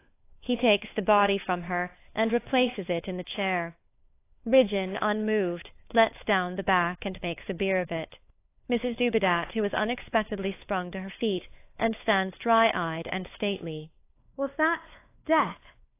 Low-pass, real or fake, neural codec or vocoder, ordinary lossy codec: 3.6 kHz; fake; codec, 16 kHz, 4 kbps, FunCodec, trained on LibriTTS, 50 frames a second; AAC, 24 kbps